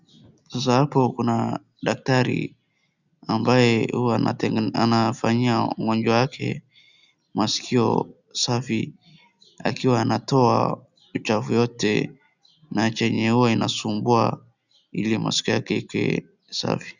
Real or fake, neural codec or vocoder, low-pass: real; none; 7.2 kHz